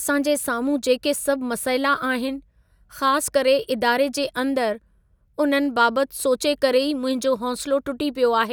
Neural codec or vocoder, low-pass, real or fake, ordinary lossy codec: none; none; real; none